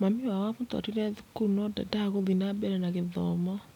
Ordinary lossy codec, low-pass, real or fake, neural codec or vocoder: none; 19.8 kHz; real; none